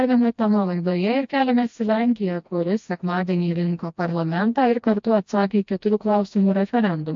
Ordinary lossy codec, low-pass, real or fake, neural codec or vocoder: MP3, 48 kbps; 7.2 kHz; fake; codec, 16 kHz, 1 kbps, FreqCodec, smaller model